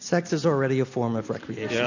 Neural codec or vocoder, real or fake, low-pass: none; real; 7.2 kHz